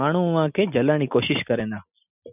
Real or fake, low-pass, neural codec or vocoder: real; 3.6 kHz; none